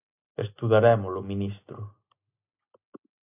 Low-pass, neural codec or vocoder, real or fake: 3.6 kHz; none; real